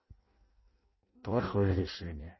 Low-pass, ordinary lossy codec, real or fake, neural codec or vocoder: 7.2 kHz; MP3, 24 kbps; fake; codec, 16 kHz in and 24 kHz out, 0.6 kbps, FireRedTTS-2 codec